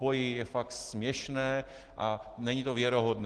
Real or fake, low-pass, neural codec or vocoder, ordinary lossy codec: real; 10.8 kHz; none; Opus, 16 kbps